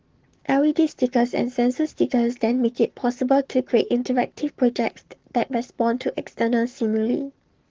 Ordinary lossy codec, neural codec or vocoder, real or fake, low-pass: Opus, 16 kbps; codec, 44.1 kHz, 7.8 kbps, Pupu-Codec; fake; 7.2 kHz